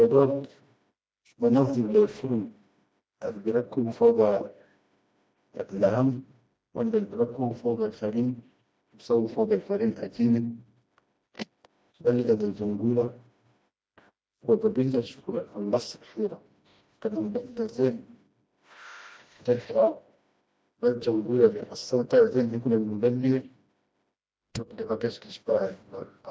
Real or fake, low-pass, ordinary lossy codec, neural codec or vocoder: fake; none; none; codec, 16 kHz, 1 kbps, FreqCodec, smaller model